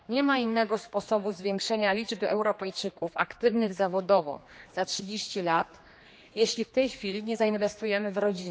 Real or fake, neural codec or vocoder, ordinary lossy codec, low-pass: fake; codec, 16 kHz, 2 kbps, X-Codec, HuBERT features, trained on general audio; none; none